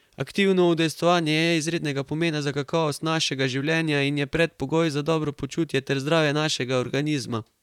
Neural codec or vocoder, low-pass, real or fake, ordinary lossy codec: vocoder, 44.1 kHz, 128 mel bands, Pupu-Vocoder; 19.8 kHz; fake; none